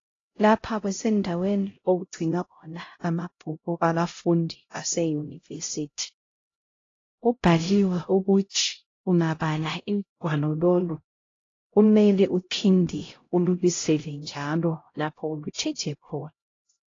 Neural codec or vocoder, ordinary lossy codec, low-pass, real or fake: codec, 16 kHz, 0.5 kbps, X-Codec, HuBERT features, trained on LibriSpeech; AAC, 32 kbps; 7.2 kHz; fake